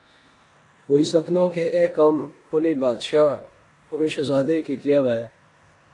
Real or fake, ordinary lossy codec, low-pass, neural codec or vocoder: fake; AAC, 48 kbps; 10.8 kHz; codec, 16 kHz in and 24 kHz out, 0.9 kbps, LongCat-Audio-Codec, four codebook decoder